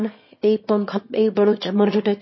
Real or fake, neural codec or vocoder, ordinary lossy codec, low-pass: fake; autoencoder, 22.05 kHz, a latent of 192 numbers a frame, VITS, trained on one speaker; MP3, 24 kbps; 7.2 kHz